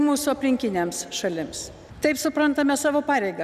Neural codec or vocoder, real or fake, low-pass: none; real; 14.4 kHz